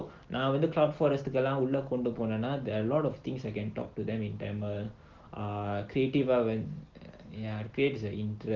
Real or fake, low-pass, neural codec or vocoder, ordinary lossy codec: real; 7.2 kHz; none; Opus, 16 kbps